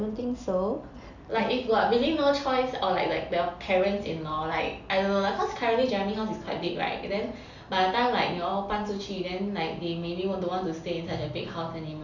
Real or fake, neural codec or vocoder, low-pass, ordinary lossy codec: real; none; 7.2 kHz; none